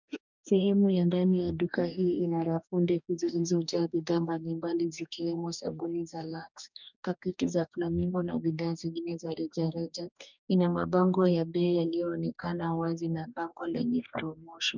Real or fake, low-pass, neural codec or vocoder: fake; 7.2 kHz; codec, 44.1 kHz, 2.6 kbps, DAC